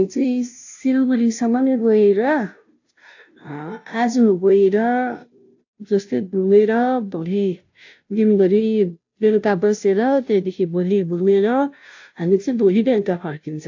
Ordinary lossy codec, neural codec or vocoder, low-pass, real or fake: AAC, 48 kbps; codec, 16 kHz, 0.5 kbps, FunCodec, trained on Chinese and English, 25 frames a second; 7.2 kHz; fake